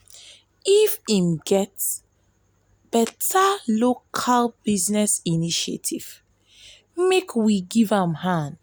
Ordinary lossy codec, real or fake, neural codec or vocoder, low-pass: none; real; none; none